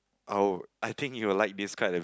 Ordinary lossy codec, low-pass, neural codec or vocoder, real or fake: none; none; none; real